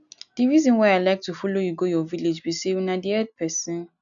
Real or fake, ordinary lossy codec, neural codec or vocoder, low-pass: real; none; none; 7.2 kHz